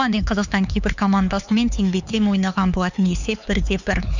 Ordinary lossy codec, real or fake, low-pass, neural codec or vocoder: none; fake; 7.2 kHz; codec, 16 kHz, 4 kbps, X-Codec, HuBERT features, trained on LibriSpeech